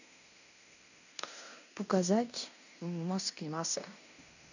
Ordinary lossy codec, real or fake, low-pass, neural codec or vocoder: none; fake; 7.2 kHz; codec, 16 kHz in and 24 kHz out, 0.9 kbps, LongCat-Audio-Codec, fine tuned four codebook decoder